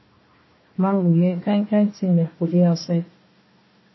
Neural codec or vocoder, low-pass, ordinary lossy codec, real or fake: codec, 16 kHz, 1 kbps, FunCodec, trained on Chinese and English, 50 frames a second; 7.2 kHz; MP3, 24 kbps; fake